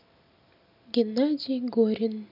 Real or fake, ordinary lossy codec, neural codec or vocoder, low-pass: real; none; none; 5.4 kHz